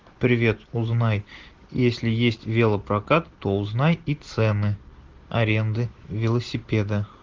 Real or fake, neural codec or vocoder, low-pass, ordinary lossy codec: real; none; 7.2 kHz; Opus, 16 kbps